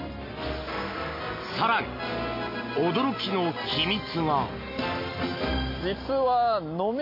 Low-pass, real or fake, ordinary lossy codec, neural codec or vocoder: 5.4 kHz; real; MP3, 32 kbps; none